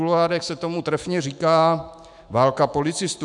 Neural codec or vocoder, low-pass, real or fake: autoencoder, 48 kHz, 128 numbers a frame, DAC-VAE, trained on Japanese speech; 10.8 kHz; fake